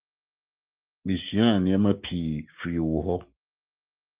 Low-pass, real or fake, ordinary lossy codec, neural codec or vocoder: 3.6 kHz; fake; Opus, 32 kbps; codec, 16 kHz, 6 kbps, DAC